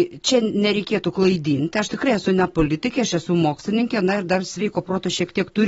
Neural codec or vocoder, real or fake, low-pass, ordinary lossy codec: none; real; 19.8 kHz; AAC, 24 kbps